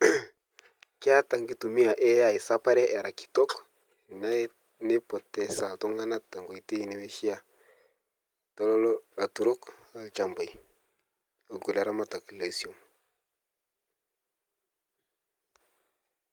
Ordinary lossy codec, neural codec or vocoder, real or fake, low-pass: Opus, 32 kbps; none; real; 19.8 kHz